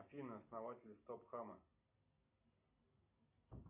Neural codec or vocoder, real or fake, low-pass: none; real; 3.6 kHz